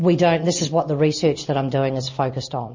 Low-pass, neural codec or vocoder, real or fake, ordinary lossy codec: 7.2 kHz; none; real; MP3, 32 kbps